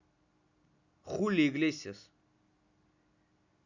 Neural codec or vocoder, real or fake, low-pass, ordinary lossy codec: none; real; 7.2 kHz; none